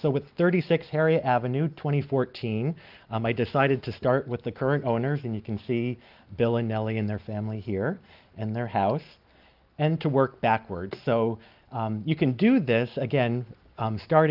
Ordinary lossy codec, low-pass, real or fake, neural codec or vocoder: Opus, 32 kbps; 5.4 kHz; real; none